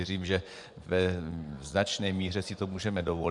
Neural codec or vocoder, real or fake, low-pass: vocoder, 24 kHz, 100 mel bands, Vocos; fake; 10.8 kHz